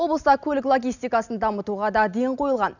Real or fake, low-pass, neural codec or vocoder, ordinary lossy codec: real; 7.2 kHz; none; none